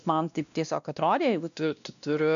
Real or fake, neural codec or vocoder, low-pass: fake; codec, 16 kHz, 1 kbps, X-Codec, WavLM features, trained on Multilingual LibriSpeech; 7.2 kHz